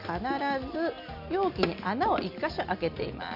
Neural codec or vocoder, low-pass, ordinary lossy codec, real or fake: none; 5.4 kHz; none; real